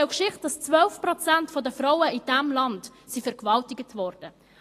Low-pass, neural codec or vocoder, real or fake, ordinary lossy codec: 14.4 kHz; none; real; AAC, 48 kbps